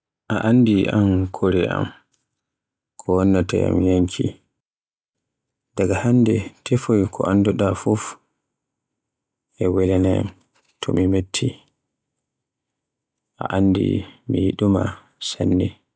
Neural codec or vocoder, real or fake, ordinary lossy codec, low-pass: none; real; none; none